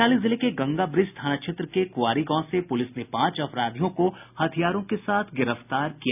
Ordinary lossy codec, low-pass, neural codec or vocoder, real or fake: none; 3.6 kHz; none; real